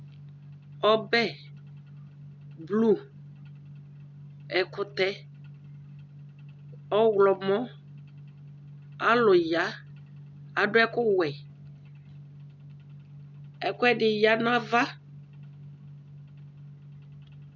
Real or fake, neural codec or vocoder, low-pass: real; none; 7.2 kHz